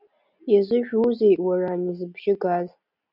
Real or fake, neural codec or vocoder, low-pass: real; none; 5.4 kHz